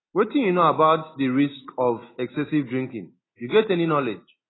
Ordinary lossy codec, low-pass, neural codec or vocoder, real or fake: AAC, 16 kbps; 7.2 kHz; none; real